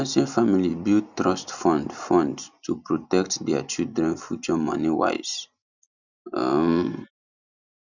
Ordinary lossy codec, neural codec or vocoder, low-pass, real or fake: none; none; 7.2 kHz; real